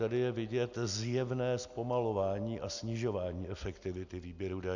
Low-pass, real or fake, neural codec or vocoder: 7.2 kHz; real; none